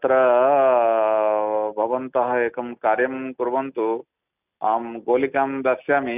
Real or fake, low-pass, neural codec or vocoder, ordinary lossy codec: real; 3.6 kHz; none; none